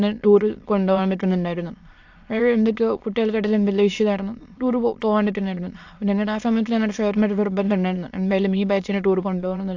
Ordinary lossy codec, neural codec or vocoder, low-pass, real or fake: AAC, 48 kbps; autoencoder, 22.05 kHz, a latent of 192 numbers a frame, VITS, trained on many speakers; 7.2 kHz; fake